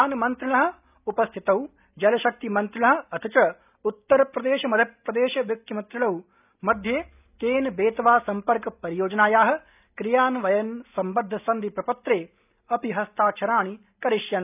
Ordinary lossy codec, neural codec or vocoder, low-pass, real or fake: none; none; 3.6 kHz; real